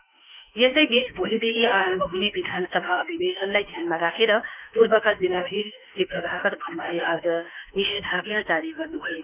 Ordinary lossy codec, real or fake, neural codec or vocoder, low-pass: none; fake; autoencoder, 48 kHz, 32 numbers a frame, DAC-VAE, trained on Japanese speech; 3.6 kHz